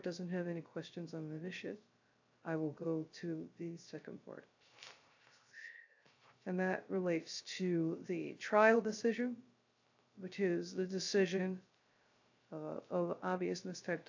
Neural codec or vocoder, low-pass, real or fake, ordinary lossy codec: codec, 16 kHz, 0.3 kbps, FocalCodec; 7.2 kHz; fake; AAC, 48 kbps